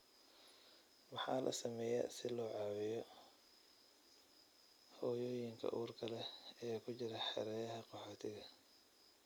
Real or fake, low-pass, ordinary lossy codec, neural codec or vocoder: real; none; none; none